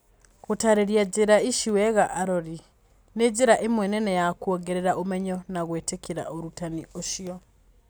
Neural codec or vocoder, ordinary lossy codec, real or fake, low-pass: none; none; real; none